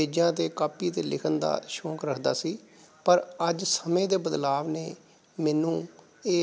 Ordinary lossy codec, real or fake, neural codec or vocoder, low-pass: none; real; none; none